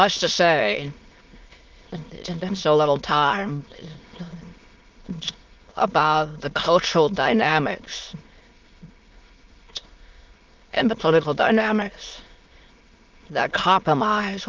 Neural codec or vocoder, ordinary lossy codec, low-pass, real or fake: autoencoder, 22.05 kHz, a latent of 192 numbers a frame, VITS, trained on many speakers; Opus, 24 kbps; 7.2 kHz; fake